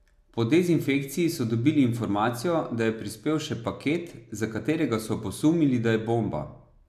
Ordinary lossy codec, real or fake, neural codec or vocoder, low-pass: AAC, 96 kbps; real; none; 14.4 kHz